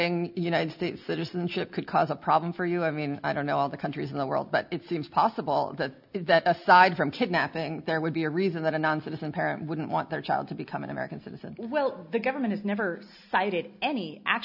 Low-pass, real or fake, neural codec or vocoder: 5.4 kHz; real; none